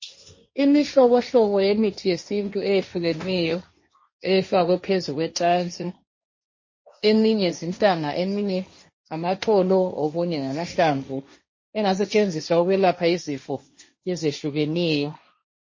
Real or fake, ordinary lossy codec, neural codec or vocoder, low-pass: fake; MP3, 32 kbps; codec, 16 kHz, 1.1 kbps, Voila-Tokenizer; 7.2 kHz